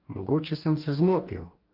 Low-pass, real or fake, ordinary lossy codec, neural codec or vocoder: 5.4 kHz; fake; Opus, 24 kbps; codec, 44.1 kHz, 2.6 kbps, DAC